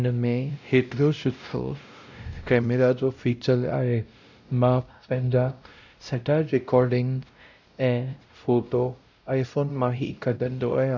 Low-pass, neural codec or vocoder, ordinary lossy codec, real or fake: 7.2 kHz; codec, 16 kHz, 0.5 kbps, X-Codec, WavLM features, trained on Multilingual LibriSpeech; none; fake